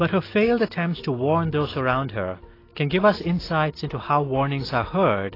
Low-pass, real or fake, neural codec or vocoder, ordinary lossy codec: 5.4 kHz; real; none; AAC, 24 kbps